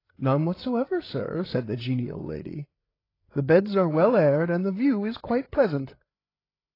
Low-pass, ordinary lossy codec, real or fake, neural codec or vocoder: 5.4 kHz; AAC, 24 kbps; fake; codec, 16 kHz, 8 kbps, FreqCodec, larger model